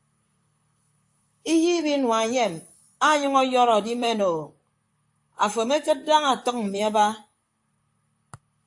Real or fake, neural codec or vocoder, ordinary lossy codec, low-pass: fake; vocoder, 44.1 kHz, 128 mel bands, Pupu-Vocoder; AAC, 64 kbps; 10.8 kHz